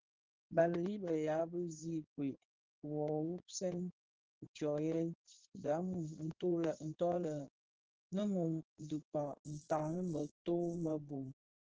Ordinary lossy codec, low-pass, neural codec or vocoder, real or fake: Opus, 16 kbps; 7.2 kHz; codec, 16 kHz in and 24 kHz out, 2.2 kbps, FireRedTTS-2 codec; fake